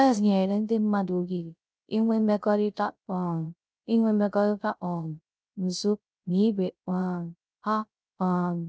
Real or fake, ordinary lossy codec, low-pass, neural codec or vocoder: fake; none; none; codec, 16 kHz, 0.3 kbps, FocalCodec